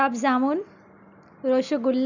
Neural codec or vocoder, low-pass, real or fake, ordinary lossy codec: none; 7.2 kHz; real; none